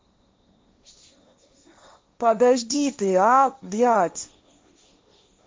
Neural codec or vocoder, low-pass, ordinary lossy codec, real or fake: codec, 16 kHz, 1.1 kbps, Voila-Tokenizer; none; none; fake